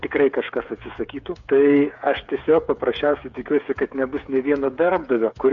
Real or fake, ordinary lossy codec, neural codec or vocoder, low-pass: fake; Opus, 64 kbps; codec, 16 kHz, 8 kbps, FreqCodec, smaller model; 7.2 kHz